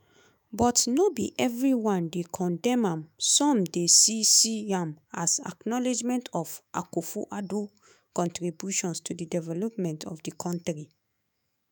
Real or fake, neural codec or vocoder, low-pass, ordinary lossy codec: fake; autoencoder, 48 kHz, 128 numbers a frame, DAC-VAE, trained on Japanese speech; none; none